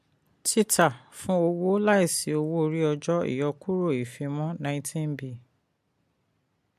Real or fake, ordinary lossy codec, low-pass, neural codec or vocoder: real; MP3, 64 kbps; 14.4 kHz; none